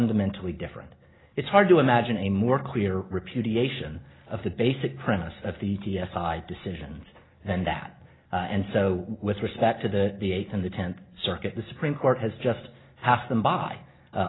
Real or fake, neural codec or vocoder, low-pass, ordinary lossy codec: real; none; 7.2 kHz; AAC, 16 kbps